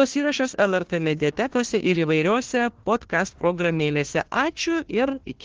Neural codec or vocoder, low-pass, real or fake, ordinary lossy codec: codec, 16 kHz, 1 kbps, FunCodec, trained on Chinese and English, 50 frames a second; 7.2 kHz; fake; Opus, 16 kbps